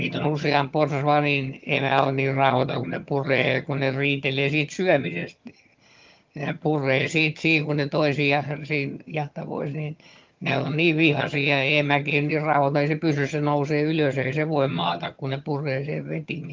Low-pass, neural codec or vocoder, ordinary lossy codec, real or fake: 7.2 kHz; vocoder, 22.05 kHz, 80 mel bands, HiFi-GAN; Opus, 32 kbps; fake